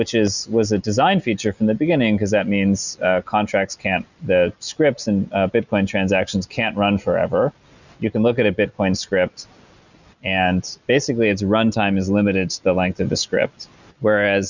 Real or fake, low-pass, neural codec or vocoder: real; 7.2 kHz; none